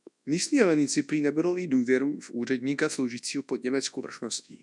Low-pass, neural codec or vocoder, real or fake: 10.8 kHz; codec, 24 kHz, 0.9 kbps, WavTokenizer, large speech release; fake